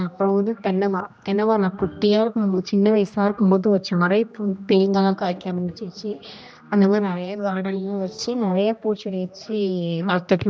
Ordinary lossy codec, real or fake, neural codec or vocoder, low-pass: none; fake; codec, 16 kHz, 1 kbps, X-Codec, HuBERT features, trained on general audio; none